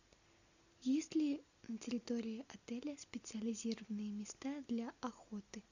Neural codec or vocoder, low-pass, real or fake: none; 7.2 kHz; real